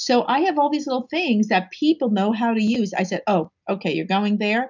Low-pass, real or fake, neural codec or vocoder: 7.2 kHz; real; none